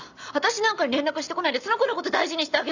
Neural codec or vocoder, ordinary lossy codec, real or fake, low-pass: none; none; real; 7.2 kHz